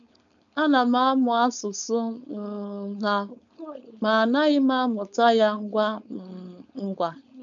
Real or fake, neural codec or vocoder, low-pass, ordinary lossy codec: fake; codec, 16 kHz, 4.8 kbps, FACodec; 7.2 kHz; none